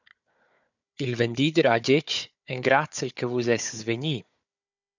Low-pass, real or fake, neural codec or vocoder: 7.2 kHz; fake; codec, 16 kHz, 4 kbps, FunCodec, trained on Chinese and English, 50 frames a second